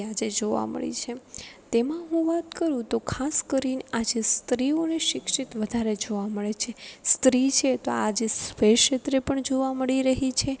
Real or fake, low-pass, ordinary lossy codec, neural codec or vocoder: real; none; none; none